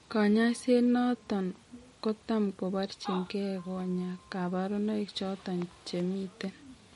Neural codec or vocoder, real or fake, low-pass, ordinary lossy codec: none; real; 19.8 kHz; MP3, 48 kbps